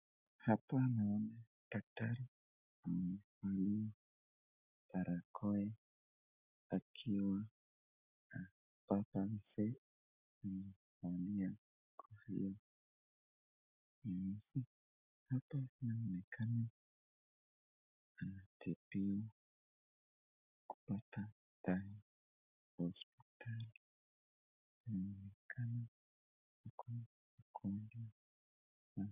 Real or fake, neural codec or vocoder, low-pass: real; none; 3.6 kHz